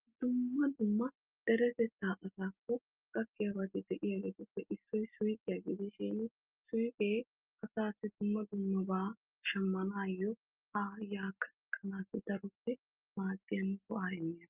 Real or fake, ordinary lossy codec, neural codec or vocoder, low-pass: real; Opus, 24 kbps; none; 3.6 kHz